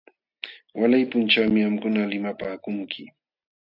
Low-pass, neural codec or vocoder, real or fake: 5.4 kHz; none; real